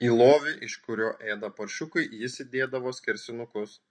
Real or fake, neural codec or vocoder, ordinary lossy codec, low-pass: real; none; MP3, 48 kbps; 9.9 kHz